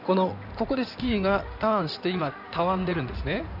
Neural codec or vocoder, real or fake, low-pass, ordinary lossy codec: codec, 16 kHz in and 24 kHz out, 2.2 kbps, FireRedTTS-2 codec; fake; 5.4 kHz; none